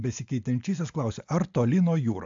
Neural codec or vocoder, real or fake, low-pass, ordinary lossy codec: none; real; 7.2 kHz; AAC, 64 kbps